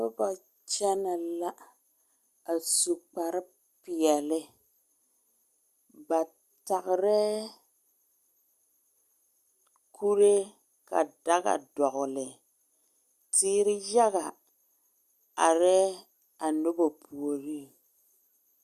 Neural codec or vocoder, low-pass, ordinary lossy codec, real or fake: none; 14.4 kHz; Opus, 64 kbps; real